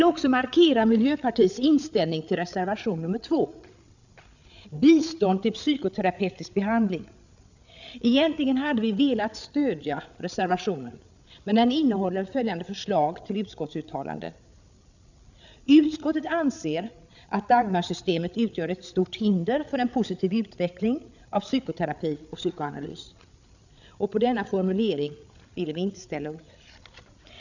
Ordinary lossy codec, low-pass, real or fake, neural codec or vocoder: none; 7.2 kHz; fake; codec, 16 kHz, 8 kbps, FreqCodec, larger model